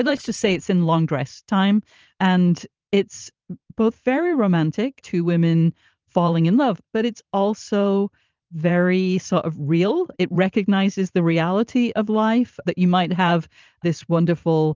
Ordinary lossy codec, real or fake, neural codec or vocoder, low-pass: Opus, 24 kbps; fake; vocoder, 44.1 kHz, 128 mel bands every 512 samples, BigVGAN v2; 7.2 kHz